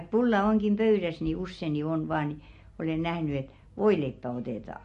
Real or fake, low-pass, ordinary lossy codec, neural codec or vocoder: real; 14.4 kHz; MP3, 48 kbps; none